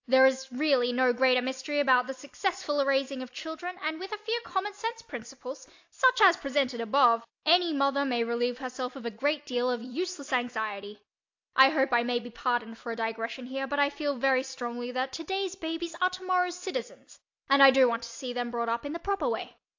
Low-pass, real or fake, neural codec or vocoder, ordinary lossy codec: 7.2 kHz; real; none; AAC, 48 kbps